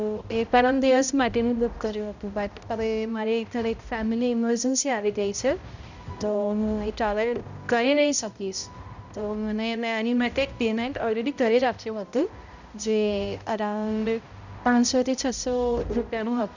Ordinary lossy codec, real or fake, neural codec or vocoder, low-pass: none; fake; codec, 16 kHz, 0.5 kbps, X-Codec, HuBERT features, trained on balanced general audio; 7.2 kHz